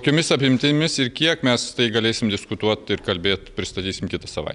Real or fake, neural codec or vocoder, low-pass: real; none; 10.8 kHz